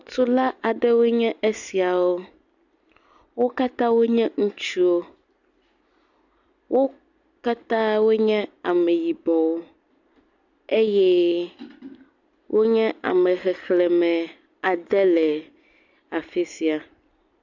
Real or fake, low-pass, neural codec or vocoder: real; 7.2 kHz; none